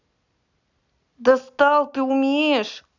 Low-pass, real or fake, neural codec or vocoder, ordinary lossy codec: 7.2 kHz; real; none; none